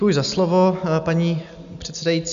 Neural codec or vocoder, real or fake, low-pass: none; real; 7.2 kHz